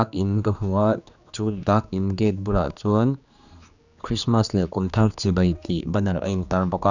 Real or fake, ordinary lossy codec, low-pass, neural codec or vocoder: fake; none; 7.2 kHz; codec, 16 kHz, 2 kbps, X-Codec, HuBERT features, trained on general audio